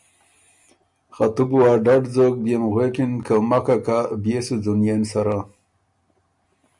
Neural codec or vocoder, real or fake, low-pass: none; real; 10.8 kHz